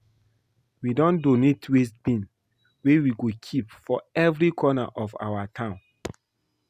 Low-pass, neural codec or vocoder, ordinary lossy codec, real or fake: 14.4 kHz; none; Opus, 64 kbps; real